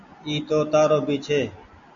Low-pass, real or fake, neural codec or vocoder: 7.2 kHz; real; none